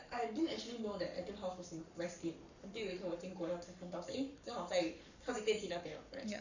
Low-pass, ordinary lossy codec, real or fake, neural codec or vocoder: 7.2 kHz; none; fake; codec, 44.1 kHz, 7.8 kbps, Pupu-Codec